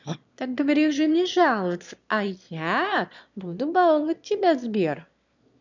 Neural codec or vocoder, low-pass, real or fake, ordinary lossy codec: autoencoder, 22.05 kHz, a latent of 192 numbers a frame, VITS, trained on one speaker; 7.2 kHz; fake; none